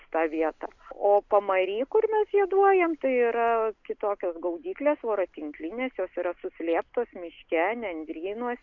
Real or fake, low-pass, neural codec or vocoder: real; 7.2 kHz; none